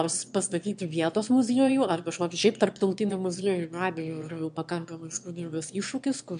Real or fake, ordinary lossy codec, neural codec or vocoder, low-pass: fake; MP3, 64 kbps; autoencoder, 22.05 kHz, a latent of 192 numbers a frame, VITS, trained on one speaker; 9.9 kHz